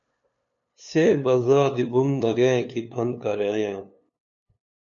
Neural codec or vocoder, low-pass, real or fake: codec, 16 kHz, 2 kbps, FunCodec, trained on LibriTTS, 25 frames a second; 7.2 kHz; fake